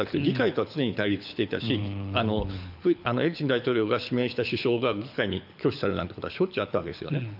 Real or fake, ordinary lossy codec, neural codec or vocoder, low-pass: fake; none; codec, 24 kHz, 6 kbps, HILCodec; 5.4 kHz